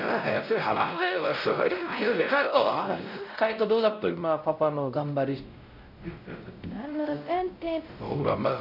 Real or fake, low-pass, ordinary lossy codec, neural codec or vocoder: fake; 5.4 kHz; none; codec, 16 kHz, 0.5 kbps, X-Codec, WavLM features, trained on Multilingual LibriSpeech